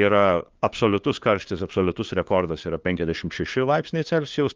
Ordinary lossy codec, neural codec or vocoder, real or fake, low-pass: Opus, 32 kbps; codec, 16 kHz, 2 kbps, FunCodec, trained on LibriTTS, 25 frames a second; fake; 7.2 kHz